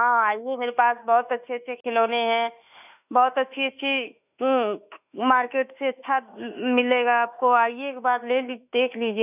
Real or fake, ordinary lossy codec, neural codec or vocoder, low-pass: fake; none; autoencoder, 48 kHz, 32 numbers a frame, DAC-VAE, trained on Japanese speech; 3.6 kHz